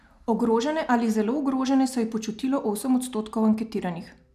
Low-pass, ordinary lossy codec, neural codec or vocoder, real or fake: 14.4 kHz; none; none; real